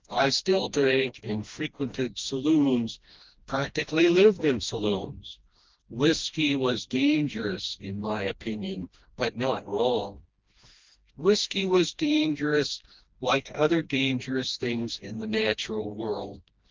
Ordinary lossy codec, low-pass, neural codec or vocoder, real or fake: Opus, 32 kbps; 7.2 kHz; codec, 16 kHz, 1 kbps, FreqCodec, smaller model; fake